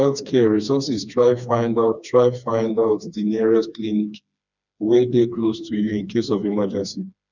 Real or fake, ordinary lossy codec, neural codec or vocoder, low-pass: fake; none; codec, 16 kHz, 2 kbps, FreqCodec, smaller model; 7.2 kHz